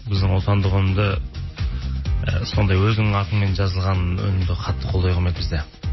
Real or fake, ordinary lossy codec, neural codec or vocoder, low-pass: real; MP3, 24 kbps; none; 7.2 kHz